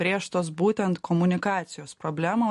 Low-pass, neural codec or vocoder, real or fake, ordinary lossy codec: 14.4 kHz; none; real; MP3, 48 kbps